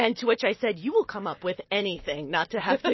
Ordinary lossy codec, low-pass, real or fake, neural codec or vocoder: MP3, 24 kbps; 7.2 kHz; real; none